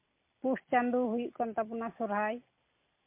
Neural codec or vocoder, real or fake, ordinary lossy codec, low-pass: none; real; MP3, 24 kbps; 3.6 kHz